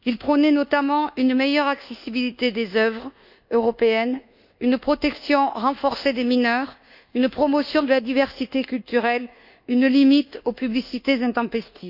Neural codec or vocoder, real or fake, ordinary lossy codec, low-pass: codec, 24 kHz, 1.2 kbps, DualCodec; fake; none; 5.4 kHz